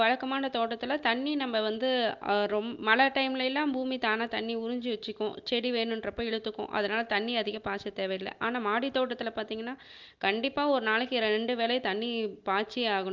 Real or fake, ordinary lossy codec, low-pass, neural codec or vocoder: real; Opus, 32 kbps; 7.2 kHz; none